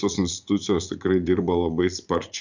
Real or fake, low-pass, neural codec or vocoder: fake; 7.2 kHz; vocoder, 44.1 kHz, 80 mel bands, Vocos